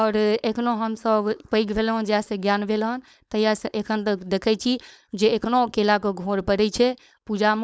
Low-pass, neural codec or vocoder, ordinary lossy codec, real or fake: none; codec, 16 kHz, 4.8 kbps, FACodec; none; fake